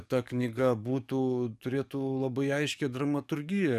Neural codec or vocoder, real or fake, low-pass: codec, 44.1 kHz, 7.8 kbps, DAC; fake; 14.4 kHz